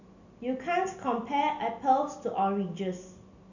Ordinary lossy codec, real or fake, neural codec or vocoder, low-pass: none; real; none; 7.2 kHz